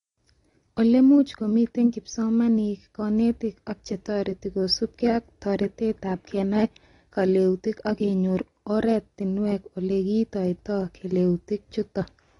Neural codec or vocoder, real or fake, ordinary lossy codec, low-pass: none; real; AAC, 32 kbps; 10.8 kHz